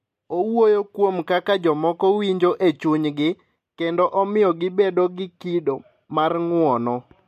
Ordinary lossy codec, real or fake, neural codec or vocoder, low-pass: MP3, 64 kbps; real; none; 14.4 kHz